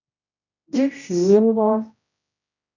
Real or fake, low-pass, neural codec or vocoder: fake; 7.2 kHz; codec, 16 kHz, 0.5 kbps, X-Codec, HuBERT features, trained on general audio